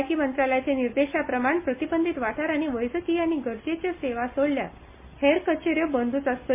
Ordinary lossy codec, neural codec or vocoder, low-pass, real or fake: MP3, 24 kbps; none; 3.6 kHz; real